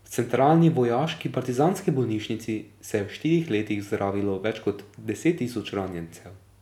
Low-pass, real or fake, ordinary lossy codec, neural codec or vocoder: 19.8 kHz; real; none; none